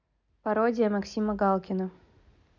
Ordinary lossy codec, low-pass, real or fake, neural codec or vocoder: none; 7.2 kHz; real; none